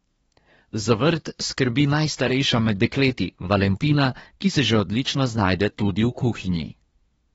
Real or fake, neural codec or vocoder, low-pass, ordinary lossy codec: fake; codec, 24 kHz, 1 kbps, SNAC; 10.8 kHz; AAC, 24 kbps